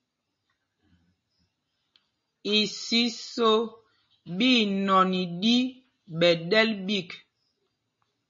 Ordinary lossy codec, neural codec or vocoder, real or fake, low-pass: MP3, 32 kbps; none; real; 7.2 kHz